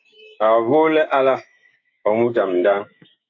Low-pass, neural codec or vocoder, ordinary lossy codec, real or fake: 7.2 kHz; autoencoder, 48 kHz, 128 numbers a frame, DAC-VAE, trained on Japanese speech; AAC, 48 kbps; fake